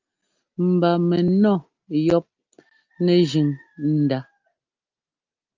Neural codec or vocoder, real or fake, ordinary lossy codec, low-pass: none; real; Opus, 24 kbps; 7.2 kHz